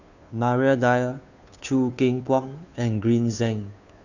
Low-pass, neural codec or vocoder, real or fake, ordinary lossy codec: 7.2 kHz; codec, 16 kHz, 2 kbps, FunCodec, trained on Chinese and English, 25 frames a second; fake; none